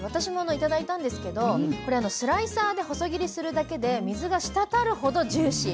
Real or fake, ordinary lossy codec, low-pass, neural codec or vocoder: real; none; none; none